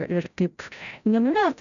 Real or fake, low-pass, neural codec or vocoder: fake; 7.2 kHz; codec, 16 kHz, 0.5 kbps, FreqCodec, larger model